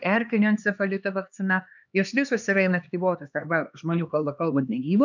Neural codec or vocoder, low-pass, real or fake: codec, 16 kHz, 2 kbps, X-Codec, HuBERT features, trained on LibriSpeech; 7.2 kHz; fake